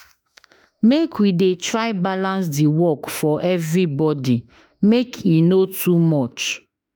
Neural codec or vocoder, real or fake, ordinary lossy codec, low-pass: autoencoder, 48 kHz, 32 numbers a frame, DAC-VAE, trained on Japanese speech; fake; none; none